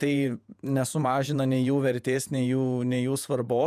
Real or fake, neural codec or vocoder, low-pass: fake; vocoder, 48 kHz, 128 mel bands, Vocos; 14.4 kHz